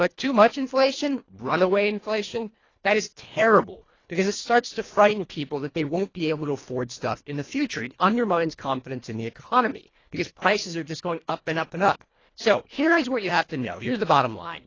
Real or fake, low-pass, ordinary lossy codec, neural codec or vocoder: fake; 7.2 kHz; AAC, 32 kbps; codec, 24 kHz, 1.5 kbps, HILCodec